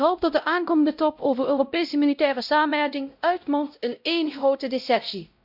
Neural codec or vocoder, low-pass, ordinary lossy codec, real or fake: codec, 16 kHz, 0.5 kbps, X-Codec, WavLM features, trained on Multilingual LibriSpeech; 5.4 kHz; none; fake